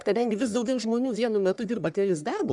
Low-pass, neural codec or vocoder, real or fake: 10.8 kHz; codec, 44.1 kHz, 1.7 kbps, Pupu-Codec; fake